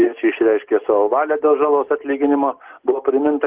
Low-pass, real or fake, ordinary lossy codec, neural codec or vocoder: 3.6 kHz; real; Opus, 16 kbps; none